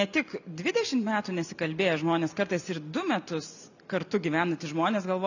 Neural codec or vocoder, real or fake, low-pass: none; real; 7.2 kHz